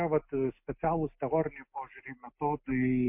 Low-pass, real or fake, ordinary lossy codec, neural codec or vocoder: 3.6 kHz; real; MP3, 32 kbps; none